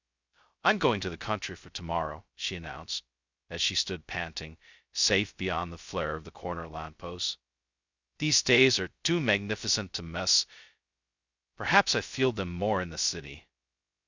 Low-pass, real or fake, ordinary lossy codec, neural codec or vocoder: 7.2 kHz; fake; Opus, 64 kbps; codec, 16 kHz, 0.2 kbps, FocalCodec